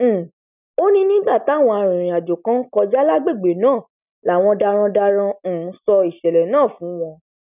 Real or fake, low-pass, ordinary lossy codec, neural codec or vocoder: real; 3.6 kHz; none; none